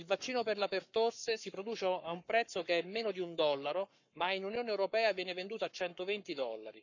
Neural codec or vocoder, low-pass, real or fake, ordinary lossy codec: codec, 44.1 kHz, 7.8 kbps, Pupu-Codec; 7.2 kHz; fake; none